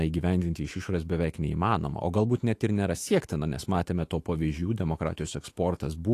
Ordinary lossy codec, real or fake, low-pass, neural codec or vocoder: AAC, 48 kbps; fake; 14.4 kHz; autoencoder, 48 kHz, 128 numbers a frame, DAC-VAE, trained on Japanese speech